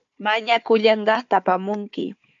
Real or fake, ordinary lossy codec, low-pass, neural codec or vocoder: fake; AAC, 64 kbps; 7.2 kHz; codec, 16 kHz, 4 kbps, FunCodec, trained on Chinese and English, 50 frames a second